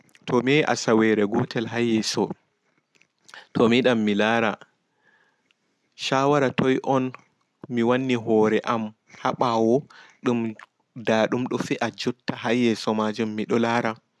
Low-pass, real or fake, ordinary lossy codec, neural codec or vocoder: none; real; none; none